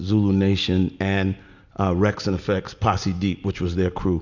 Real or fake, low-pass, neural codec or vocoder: real; 7.2 kHz; none